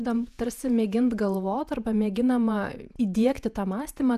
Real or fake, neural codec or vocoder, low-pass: fake; vocoder, 48 kHz, 128 mel bands, Vocos; 14.4 kHz